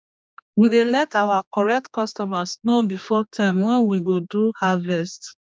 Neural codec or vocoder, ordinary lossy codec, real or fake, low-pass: codec, 16 kHz, 2 kbps, X-Codec, HuBERT features, trained on general audio; none; fake; none